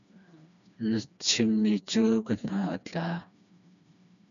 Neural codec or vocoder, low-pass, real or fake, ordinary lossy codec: codec, 16 kHz, 2 kbps, FreqCodec, smaller model; 7.2 kHz; fake; MP3, 96 kbps